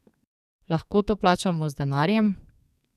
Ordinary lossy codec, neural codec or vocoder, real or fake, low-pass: none; codec, 44.1 kHz, 2.6 kbps, SNAC; fake; 14.4 kHz